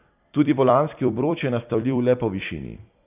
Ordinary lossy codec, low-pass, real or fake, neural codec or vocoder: none; 3.6 kHz; fake; vocoder, 44.1 kHz, 128 mel bands every 256 samples, BigVGAN v2